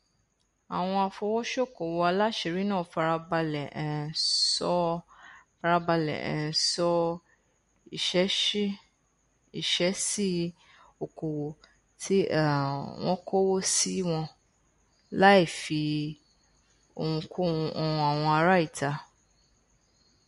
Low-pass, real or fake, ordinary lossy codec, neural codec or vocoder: 14.4 kHz; real; MP3, 48 kbps; none